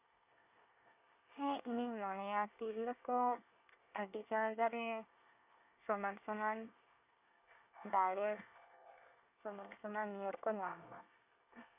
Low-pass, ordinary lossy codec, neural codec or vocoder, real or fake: 3.6 kHz; none; codec, 24 kHz, 1 kbps, SNAC; fake